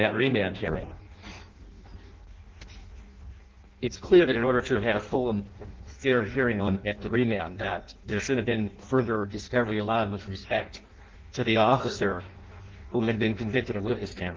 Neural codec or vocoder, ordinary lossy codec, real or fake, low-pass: codec, 16 kHz in and 24 kHz out, 0.6 kbps, FireRedTTS-2 codec; Opus, 16 kbps; fake; 7.2 kHz